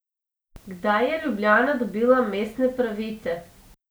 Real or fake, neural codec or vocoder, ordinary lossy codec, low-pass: real; none; none; none